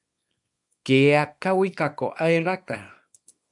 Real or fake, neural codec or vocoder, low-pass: fake; codec, 24 kHz, 0.9 kbps, WavTokenizer, small release; 10.8 kHz